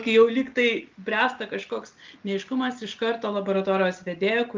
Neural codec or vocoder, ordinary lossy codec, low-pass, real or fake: none; Opus, 24 kbps; 7.2 kHz; real